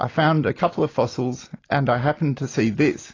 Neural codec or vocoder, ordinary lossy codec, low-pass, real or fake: none; AAC, 32 kbps; 7.2 kHz; real